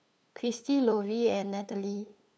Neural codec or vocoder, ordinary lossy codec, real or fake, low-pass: codec, 16 kHz, 8 kbps, FunCodec, trained on LibriTTS, 25 frames a second; none; fake; none